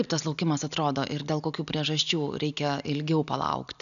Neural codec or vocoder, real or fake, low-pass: none; real; 7.2 kHz